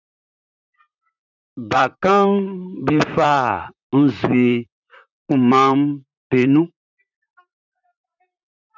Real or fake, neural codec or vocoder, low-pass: fake; vocoder, 44.1 kHz, 128 mel bands, Pupu-Vocoder; 7.2 kHz